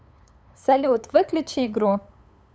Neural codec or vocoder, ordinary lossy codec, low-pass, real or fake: codec, 16 kHz, 8 kbps, FunCodec, trained on LibriTTS, 25 frames a second; none; none; fake